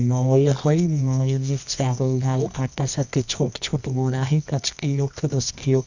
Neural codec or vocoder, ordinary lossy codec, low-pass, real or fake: codec, 24 kHz, 0.9 kbps, WavTokenizer, medium music audio release; none; 7.2 kHz; fake